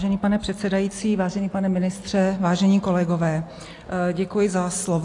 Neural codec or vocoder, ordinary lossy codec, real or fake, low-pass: none; AAC, 48 kbps; real; 10.8 kHz